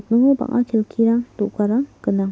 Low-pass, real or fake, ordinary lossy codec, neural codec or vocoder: none; real; none; none